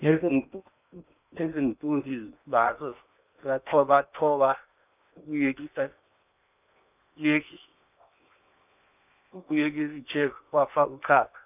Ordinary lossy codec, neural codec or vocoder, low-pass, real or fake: none; codec, 16 kHz in and 24 kHz out, 0.8 kbps, FocalCodec, streaming, 65536 codes; 3.6 kHz; fake